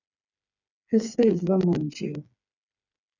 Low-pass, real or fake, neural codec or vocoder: 7.2 kHz; fake; codec, 16 kHz, 4 kbps, FreqCodec, smaller model